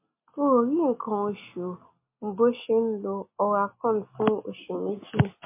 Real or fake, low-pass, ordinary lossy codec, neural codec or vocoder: real; 3.6 kHz; MP3, 24 kbps; none